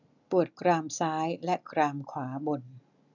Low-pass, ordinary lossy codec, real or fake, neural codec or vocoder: 7.2 kHz; none; real; none